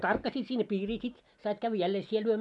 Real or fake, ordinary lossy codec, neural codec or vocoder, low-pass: real; MP3, 96 kbps; none; 10.8 kHz